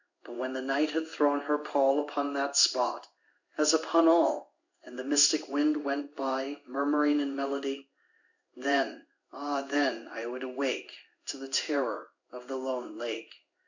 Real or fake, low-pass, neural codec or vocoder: fake; 7.2 kHz; codec, 16 kHz in and 24 kHz out, 1 kbps, XY-Tokenizer